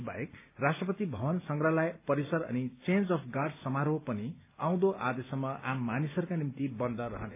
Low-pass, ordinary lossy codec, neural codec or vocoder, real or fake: 3.6 kHz; AAC, 24 kbps; none; real